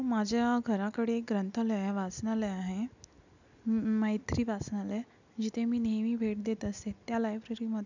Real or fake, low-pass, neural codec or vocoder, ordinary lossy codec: real; 7.2 kHz; none; none